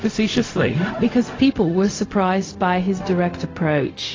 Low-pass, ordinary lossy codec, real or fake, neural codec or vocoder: 7.2 kHz; AAC, 32 kbps; fake; codec, 16 kHz, 0.4 kbps, LongCat-Audio-Codec